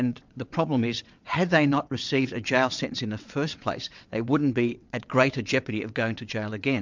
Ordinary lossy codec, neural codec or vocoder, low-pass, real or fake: MP3, 64 kbps; vocoder, 22.05 kHz, 80 mel bands, WaveNeXt; 7.2 kHz; fake